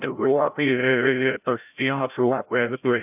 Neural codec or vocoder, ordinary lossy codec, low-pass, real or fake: codec, 16 kHz, 0.5 kbps, FreqCodec, larger model; AAC, 32 kbps; 3.6 kHz; fake